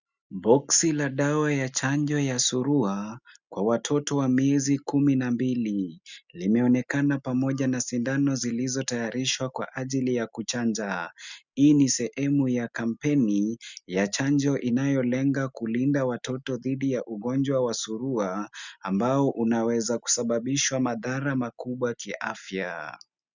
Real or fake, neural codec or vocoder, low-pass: real; none; 7.2 kHz